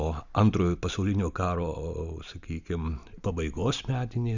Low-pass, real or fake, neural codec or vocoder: 7.2 kHz; real; none